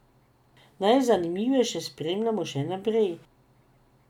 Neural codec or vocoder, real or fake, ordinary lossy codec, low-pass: none; real; none; 19.8 kHz